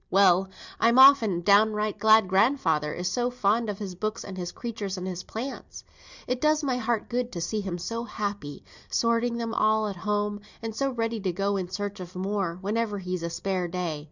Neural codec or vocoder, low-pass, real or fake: none; 7.2 kHz; real